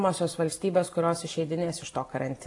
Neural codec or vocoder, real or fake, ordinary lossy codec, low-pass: none; real; AAC, 48 kbps; 10.8 kHz